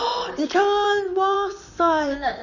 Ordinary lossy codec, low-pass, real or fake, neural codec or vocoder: none; 7.2 kHz; fake; vocoder, 44.1 kHz, 128 mel bands, Pupu-Vocoder